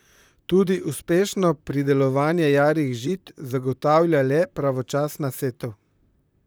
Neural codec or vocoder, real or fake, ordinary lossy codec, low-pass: vocoder, 44.1 kHz, 128 mel bands, Pupu-Vocoder; fake; none; none